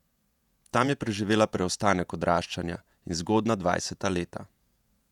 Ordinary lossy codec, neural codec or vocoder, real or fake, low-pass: none; vocoder, 48 kHz, 128 mel bands, Vocos; fake; 19.8 kHz